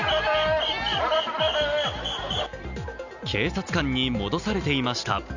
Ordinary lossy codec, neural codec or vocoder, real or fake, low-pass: Opus, 64 kbps; none; real; 7.2 kHz